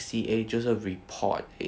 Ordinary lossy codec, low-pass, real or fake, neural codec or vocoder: none; none; real; none